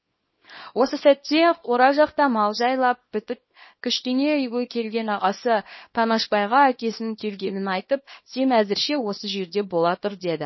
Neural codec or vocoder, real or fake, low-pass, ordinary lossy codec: codec, 24 kHz, 0.9 kbps, WavTokenizer, small release; fake; 7.2 kHz; MP3, 24 kbps